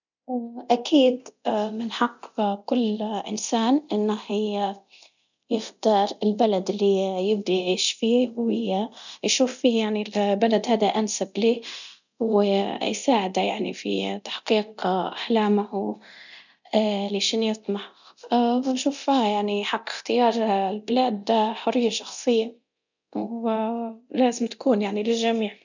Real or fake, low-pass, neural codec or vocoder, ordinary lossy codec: fake; 7.2 kHz; codec, 24 kHz, 0.9 kbps, DualCodec; none